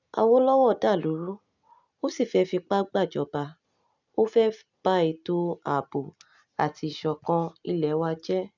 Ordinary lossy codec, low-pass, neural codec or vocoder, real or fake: none; 7.2 kHz; none; real